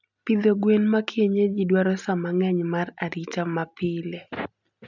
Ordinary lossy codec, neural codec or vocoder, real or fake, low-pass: none; none; real; 7.2 kHz